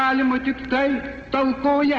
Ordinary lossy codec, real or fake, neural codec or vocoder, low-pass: Opus, 16 kbps; real; none; 7.2 kHz